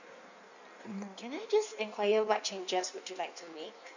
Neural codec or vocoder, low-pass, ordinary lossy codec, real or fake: codec, 16 kHz in and 24 kHz out, 1.1 kbps, FireRedTTS-2 codec; 7.2 kHz; none; fake